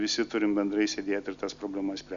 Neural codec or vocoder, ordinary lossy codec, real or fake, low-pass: none; Opus, 64 kbps; real; 7.2 kHz